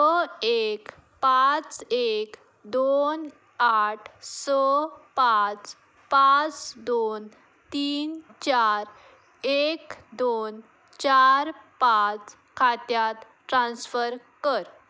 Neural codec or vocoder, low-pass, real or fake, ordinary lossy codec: none; none; real; none